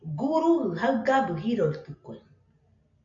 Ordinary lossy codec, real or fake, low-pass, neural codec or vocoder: AAC, 64 kbps; real; 7.2 kHz; none